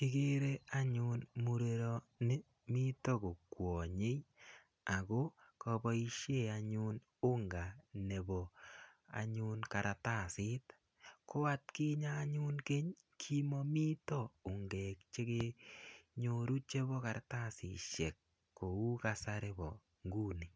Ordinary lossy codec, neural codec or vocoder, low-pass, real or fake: none; none; none; real